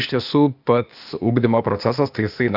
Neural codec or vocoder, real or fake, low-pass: codec, 16 kHz, 0.8 kbps, ZipCodec; fake; 5.4 kHz